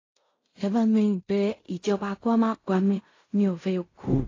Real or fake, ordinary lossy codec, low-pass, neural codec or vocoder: fake; AAC, 32 kbps; 7.2 kHz; codec, 16 kHz in and 24 kHz out, 0.4 kbps, LongCat-Audio-Codec, fine tuned four codebook decoder